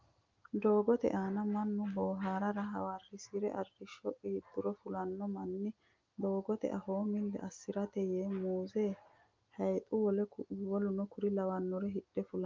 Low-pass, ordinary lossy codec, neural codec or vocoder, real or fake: 7.2 kHz; Opus, 32 kbps; none; real